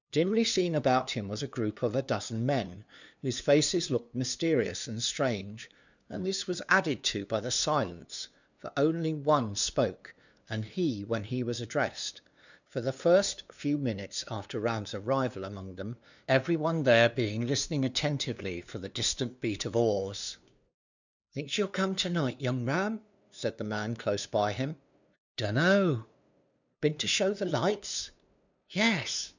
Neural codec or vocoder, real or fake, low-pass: codec, 16 kHz, 2 kbps, FunCodec, trained on LibriTTS, 25 frames a second; fake; 7.2 kHz